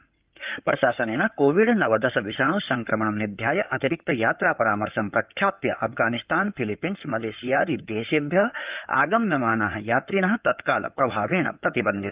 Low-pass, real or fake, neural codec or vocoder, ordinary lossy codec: 3.6 kHz; fake; codec, 16 kHz in and 24 kHz out, 2.2 kbps, FireRedTTS-2 codec; Opus, 24 kbps